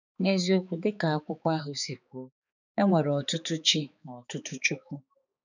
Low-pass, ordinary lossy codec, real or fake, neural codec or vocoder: 7.2 kHz; none; fake; codec, 16 kHz, 4 kbps, X-Codec, HuBERT features, trained on balanced general audio